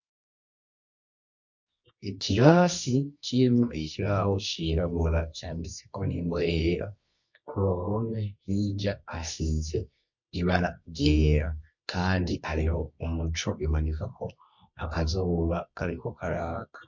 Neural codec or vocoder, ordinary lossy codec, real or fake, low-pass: codec, 24 kHz, 0.9 kbps, WavTokenizer, medium music audio release; MP3, 48 kbps; fake; 7.2 kHz